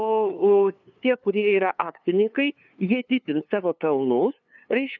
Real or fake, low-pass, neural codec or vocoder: fake; 7.2 kHz; codec, 16 kHz, 2 kbps, FunCodec, trained on LibriTTS, 25 frames a second